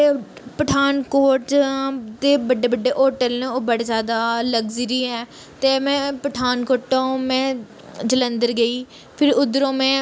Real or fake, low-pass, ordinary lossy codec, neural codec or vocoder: real; none; none; none